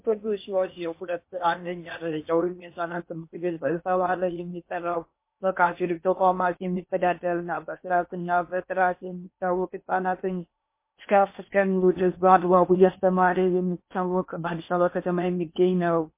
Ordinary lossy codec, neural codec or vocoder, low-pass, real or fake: MP3, 24 kbps; codec, 16 kHz in and 24 kHz out, 0.8 kbps, FocalCodec, streaming, 65536 codes; 3.6 kHz; fake